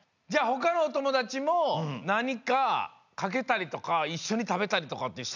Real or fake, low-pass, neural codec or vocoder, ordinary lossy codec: real; 7.2 kHz; none; none